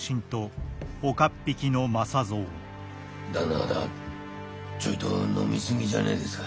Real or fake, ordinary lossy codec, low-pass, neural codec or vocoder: real; none; none; none